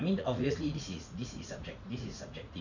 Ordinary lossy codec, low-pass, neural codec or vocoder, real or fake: none; 7.2 kHz; vocoder, 44.1 kHz, 80 mel bands, Vocos; fake